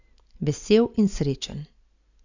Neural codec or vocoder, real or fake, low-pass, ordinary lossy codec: none; real; 7.2 kHz; none